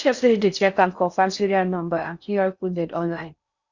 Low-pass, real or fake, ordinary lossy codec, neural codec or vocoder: 7.2 kHz; fake; Opus, 64 kbps; codec, 16 kHz in and 24 kHz out, 0.6 kbps, FocalCodec, streaming, 4096 codes